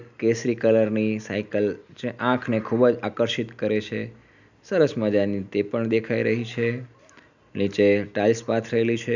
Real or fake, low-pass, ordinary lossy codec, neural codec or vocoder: real; 7.2 kHz; none; none